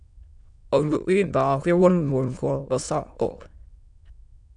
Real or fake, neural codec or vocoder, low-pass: fake; autoencoder, 22.05 kHz, a latent of 192 numbers a frame, VITS, trained on many speakers; 9.9 kHz